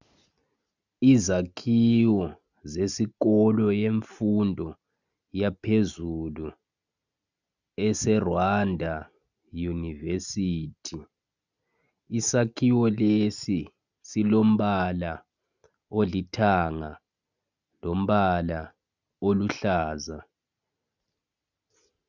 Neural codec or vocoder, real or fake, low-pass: none; real; 7.2 kHz